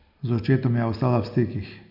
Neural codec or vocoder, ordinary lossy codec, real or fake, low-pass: none; none; real; 5.4 kHz